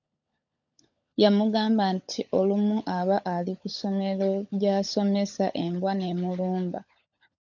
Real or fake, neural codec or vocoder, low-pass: fake; codec, 16 kHz, 16 kbps, FunCodec, trained on LibriTTS, 50 frames a second; 7.2 kHz